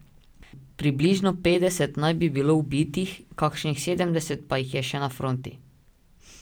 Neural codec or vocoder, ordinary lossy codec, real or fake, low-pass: vocoder, 44.1 kHz, 128 mel bands every 512 samples, BigVGAN v2; none; fake; none